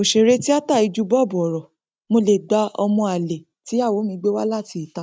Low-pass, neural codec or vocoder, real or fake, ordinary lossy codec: none; none; real; none